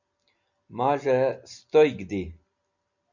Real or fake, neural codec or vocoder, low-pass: real; none; 7.2 kHz